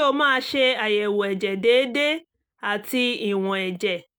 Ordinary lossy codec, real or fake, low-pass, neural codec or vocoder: none; real; none; none